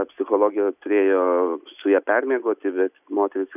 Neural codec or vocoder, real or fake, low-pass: none; real; 3.6 kHz